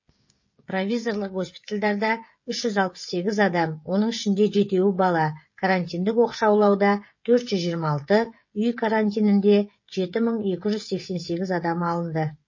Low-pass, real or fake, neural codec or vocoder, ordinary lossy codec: 7.2 kHz; fake; codec, 16 kHz, 16 kbps, FreqCodec, smaller model; MP3, 32 kbps